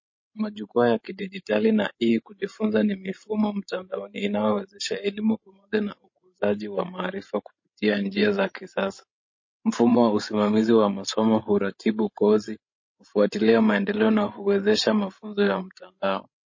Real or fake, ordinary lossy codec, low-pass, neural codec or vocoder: fake; MP3, 32 kbps; 7.2 kHz; codec, 16 kHz, 16 kbps, FreqCodec, larger model